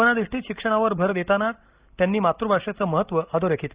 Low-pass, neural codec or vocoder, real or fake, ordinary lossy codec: 3.6 kHz; none; real; Opus, 64 kbps